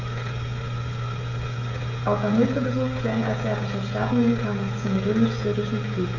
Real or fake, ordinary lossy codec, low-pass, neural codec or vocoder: fake; none; 7.2 kHz; codec, 16 kHz, 16 kbps, FreqCodec, smaller model